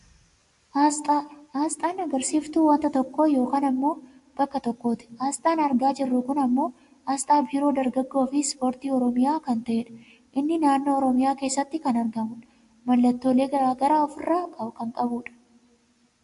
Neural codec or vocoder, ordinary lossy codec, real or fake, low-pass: none; AAC, 64 kbps; real; 10.8 kHz